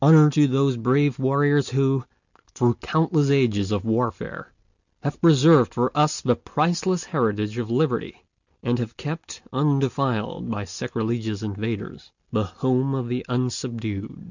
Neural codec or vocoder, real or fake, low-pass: none; real; 7.2 kHz